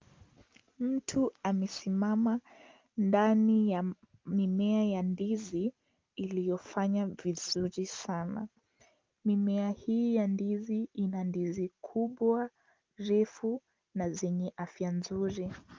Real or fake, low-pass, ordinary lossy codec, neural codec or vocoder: real; 7.2 kHz; Opus, 24 kbps; none